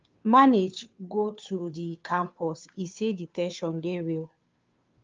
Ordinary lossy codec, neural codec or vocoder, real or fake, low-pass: Opus, 24 kbps; codec, 16 kHz, 2 kbps, FunCodec, trained on Chinese and English, 25 frames a second; fake; 7.2 kHz